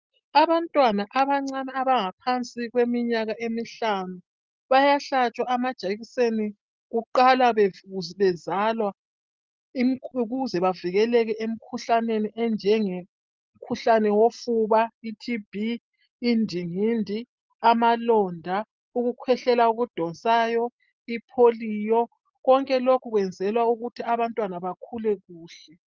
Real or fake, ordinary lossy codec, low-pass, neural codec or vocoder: real; Opus, 24 kbps; 7.2 kHz; none